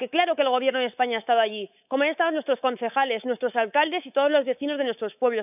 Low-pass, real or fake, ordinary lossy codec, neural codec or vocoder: 3.6 kHz; fake; none; codec, 24 kHz, 3.1 kbps, DualCodec